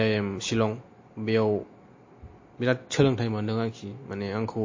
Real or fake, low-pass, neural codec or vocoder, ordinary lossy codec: real; 7.2 kHz; none; MP3, 32 kbps